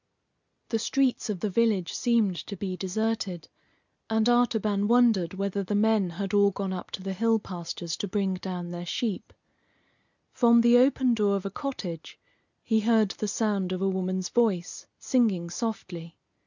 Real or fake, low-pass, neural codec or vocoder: real; 7.2 kHz; none